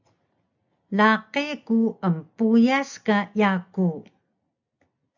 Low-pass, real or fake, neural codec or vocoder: 7.2 kHz; real; none